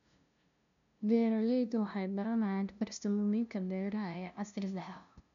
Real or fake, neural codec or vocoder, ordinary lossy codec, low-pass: fake; codec, 16 kHz, 0.5 kbps, FunCodec, trained on LibriTTS, 25 frames a second; none; 7.2 kHz